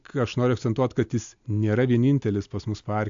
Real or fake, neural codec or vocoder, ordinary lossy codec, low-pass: real; none; AAC, 64 kbps; 7.2 kHz